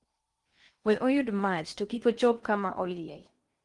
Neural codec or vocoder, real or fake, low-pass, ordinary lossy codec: codec, 16 kHz in and 24 kHz out, 0.8 kbps, FocalCodec, streaming, 65536 codes; fake; 10.8 kHz; Opus, 24 kbps